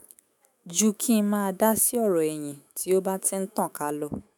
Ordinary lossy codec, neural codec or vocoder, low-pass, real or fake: none; autoencoder, 48 kHz, 128 numbers a frame, DAC-VAE, trained on Japanese speech; none; fake